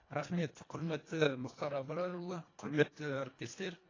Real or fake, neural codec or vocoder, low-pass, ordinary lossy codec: fake; codec, 24 kHz, 1.5 kbps, HILCodec; 7.2 kHz; AAC, 32 kbps